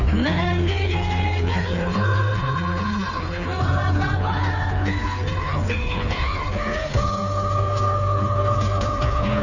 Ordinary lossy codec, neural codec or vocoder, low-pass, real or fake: none; codec, 16 kHz, 4 kbps, FreqCodec, smaller model; 7.2 kHz; fake